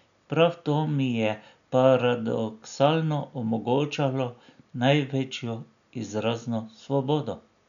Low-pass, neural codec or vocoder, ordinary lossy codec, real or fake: 7.2 kHz; none; none; real